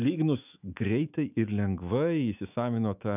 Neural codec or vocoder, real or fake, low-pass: autoencoder, 48 kHz, 128 numbers a frame, DAC-VAE, trained on Japanese speech; fake; 3.6 kHz